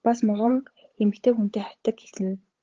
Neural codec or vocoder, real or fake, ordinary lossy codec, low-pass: codec, 16 kHz, 8 kbps, FunCodec, trained on LibriTTS, 25 frames a second; fake; Opus, 16 kbps; 7.2 kHz